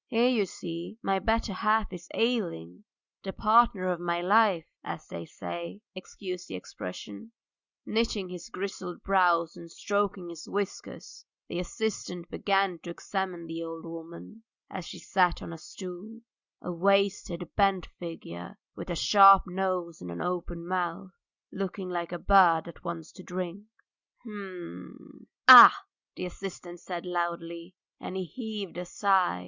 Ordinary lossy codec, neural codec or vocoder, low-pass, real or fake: Opus, 64 kbps; none; 7.2 kHz; real